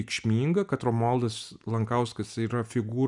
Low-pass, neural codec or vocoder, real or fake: 10.8 kHz; none; real